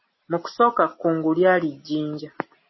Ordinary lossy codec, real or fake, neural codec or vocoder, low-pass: MP3, 24 kbps; real; none; 7.2 kHz